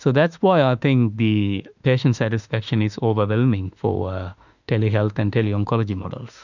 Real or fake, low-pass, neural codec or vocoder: fake; 7.2 kHz; autoencoder, 48 kHz, 32 numbers a frame, DAC-VAE, trained on Japanese speech